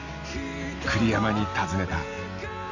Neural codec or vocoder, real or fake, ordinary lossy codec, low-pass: none; real; none; 7.2 kHz